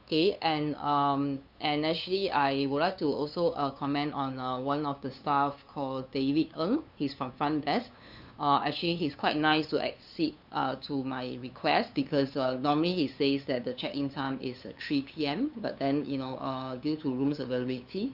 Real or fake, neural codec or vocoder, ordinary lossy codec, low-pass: fake; codec, 16 kHz, 2 kbps, FunCodec, trained on LibriTTS, 25 frames a second; none; 5.4 kHz